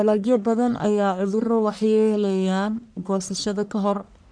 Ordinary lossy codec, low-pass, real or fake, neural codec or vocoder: none; 9.9 kHz; fake; codec, 44.1 kHz, 1.7 kbps, Pupu-Codec